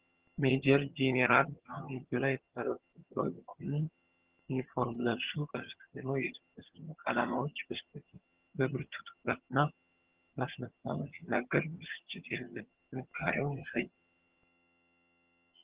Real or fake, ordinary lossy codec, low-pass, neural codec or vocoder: fake; Opus, 16 kbps; 3.6 kHz; vocoder, 22.05 kHz, 80 mel bands, HiFi-GAN